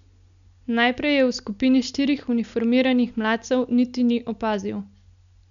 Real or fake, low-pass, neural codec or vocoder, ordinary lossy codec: real; 7.2 kHz; none; none